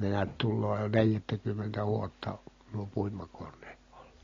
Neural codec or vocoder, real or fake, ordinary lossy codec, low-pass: none; real; AAC, 32 kbps; 7.2 kHz